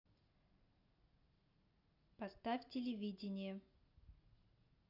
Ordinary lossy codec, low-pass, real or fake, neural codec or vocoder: none; 5.4 kHz; real; none